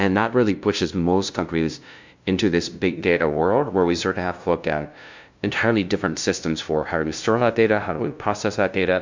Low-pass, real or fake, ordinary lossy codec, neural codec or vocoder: 7.2 kHz; fake; MP3, 64 kbps; codec, 16 kHz, 0.5 kbps, FunCodec, trained on LibriTTS, 25 frames a second